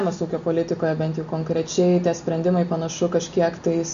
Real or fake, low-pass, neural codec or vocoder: real; 7.2 kHz; none